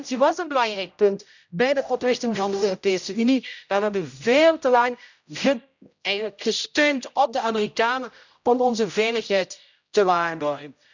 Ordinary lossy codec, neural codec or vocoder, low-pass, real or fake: none; codec, 16 kHz, 0.5 kbps, X-Codec, HuBERT features, trained on general audio; 7.2 kHz; fake